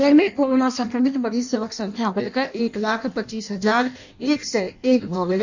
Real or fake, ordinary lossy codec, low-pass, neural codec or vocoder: fake; none; 7.2 kHz; codec, 16 kHz in and 24 kHz out, 0.6 kbps, FireRedTTS-2 codec